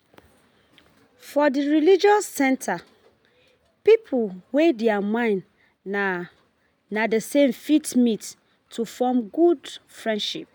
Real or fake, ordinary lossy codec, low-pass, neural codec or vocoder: real; none; none; none